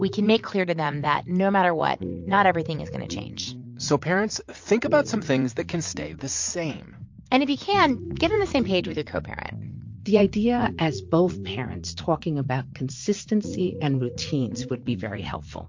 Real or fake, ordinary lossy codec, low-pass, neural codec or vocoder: fake; MP3, 48 kbps; 7.2 kHz; vocoder, 44.1 kHz, 80 mel bands, Vocos